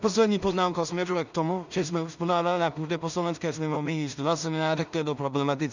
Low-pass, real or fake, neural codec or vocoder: 7.2 kHz; fake; codec, 16 kHz in and 24 kHz out, 0.4 kbps, LongCat-Audio-Codec, two codebook decoder